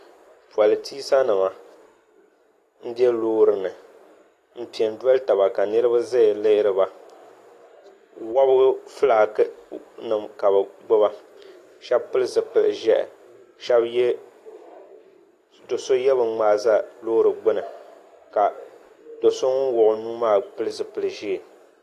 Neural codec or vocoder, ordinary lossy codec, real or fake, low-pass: none; AAC, 48 kbps; real; 14.4 kHz